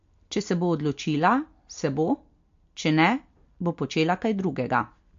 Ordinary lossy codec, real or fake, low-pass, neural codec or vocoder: MP3, 48 kbps; real; 7.2 kHz; none